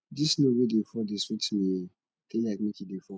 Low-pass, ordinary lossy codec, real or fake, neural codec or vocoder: none; none; real; none